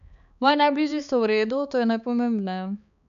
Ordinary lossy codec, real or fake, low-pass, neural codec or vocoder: none; fake; 7.2 kHz; codec, 16 kHz, 4 kbps, X-Codec, HuBERT features, trained on balanced general audio